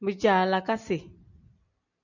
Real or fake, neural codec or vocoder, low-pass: real; none; 7.2 kHz